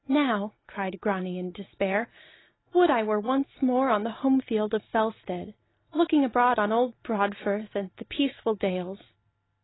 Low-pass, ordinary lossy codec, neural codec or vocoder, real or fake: 7.2 kHz; AAC, 16 kbps; none; real